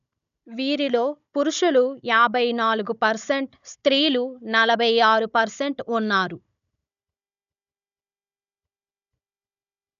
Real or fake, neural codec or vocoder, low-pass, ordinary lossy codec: fake; codec, 16 kHz, 16 kbps, FunCodec, trained on Chinese and English, 50 frames a second; 7.2 kHz; none